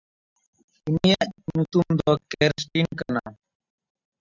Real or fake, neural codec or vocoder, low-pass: real; none; 7.2 kHz